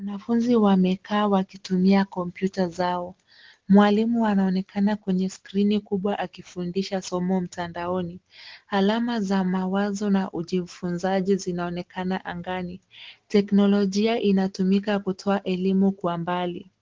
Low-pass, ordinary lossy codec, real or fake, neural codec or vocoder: 7.2 kHz; Opus, 16 kbps; real; none